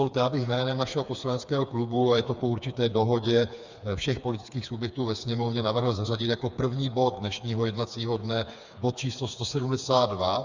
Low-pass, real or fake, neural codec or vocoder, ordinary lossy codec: 7.2 kHz; fake; codec, 16 kHz, 4 kbps, FreqCodec, smaller model; Opus, 64 kbps